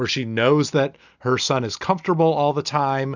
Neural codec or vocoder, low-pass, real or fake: none; 7.2 kHz; real